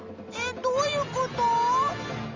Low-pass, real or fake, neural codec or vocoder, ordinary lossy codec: 7.2 kHz; real; none; Opus, 32 kbps